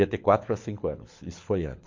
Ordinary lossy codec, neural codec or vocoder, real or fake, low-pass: MP3, 48 kbps; codec, 24 kHz, 6 kbps, HILCodec; fake; 7.2 kHz